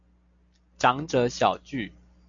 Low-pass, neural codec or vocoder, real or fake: 7.2 kHz; none; real